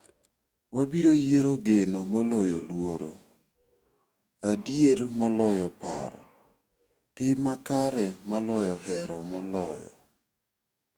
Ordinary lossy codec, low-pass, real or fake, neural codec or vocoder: Opus, 64 kbps; 19.8 kHz; fake; codec, 44.1 kHz, 2.6 kbps, DAC